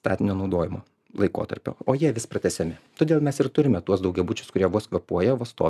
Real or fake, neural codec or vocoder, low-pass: real; none; 14.4 kHz